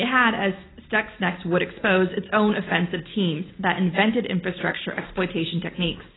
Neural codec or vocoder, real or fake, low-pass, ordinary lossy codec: none; real; 7.2 kHz; AAC, 16 kbps